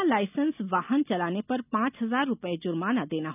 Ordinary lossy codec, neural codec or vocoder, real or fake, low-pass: none; none; real; 3.6 kHz